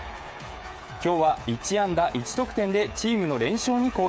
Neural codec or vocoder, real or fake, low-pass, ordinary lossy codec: codec, 16 kHz, 8 kbps, FreqCodec, smaller model; fake; none; none